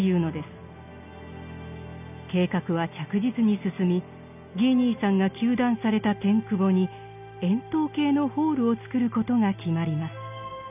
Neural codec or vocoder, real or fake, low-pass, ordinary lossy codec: none; real; 3.6 kHz; none